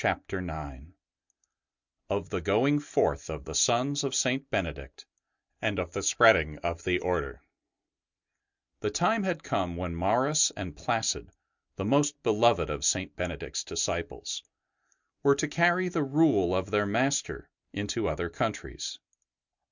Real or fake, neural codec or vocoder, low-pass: real; none; 7.2 kHz